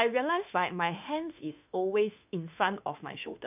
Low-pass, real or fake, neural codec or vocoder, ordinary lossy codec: 3.6 kHz; fake; autoencoder, 48 kHz, 32 numbers a frame, DAC-VAE, trained on Japanese speech; none